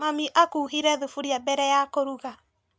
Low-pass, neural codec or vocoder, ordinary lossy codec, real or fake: none; none; none; real